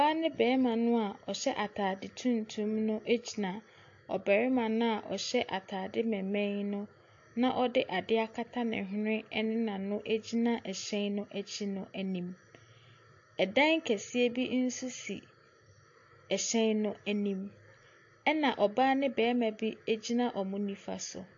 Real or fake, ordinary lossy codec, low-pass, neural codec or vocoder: real; AAC, 48 kbps; 7.2 kHz; none